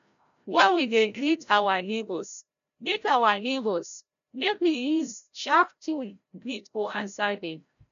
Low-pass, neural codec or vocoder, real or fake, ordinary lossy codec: 7.2 kHz; codec, 16 kHz, 0.5 kbps, FreqCodec, larger model; fake; none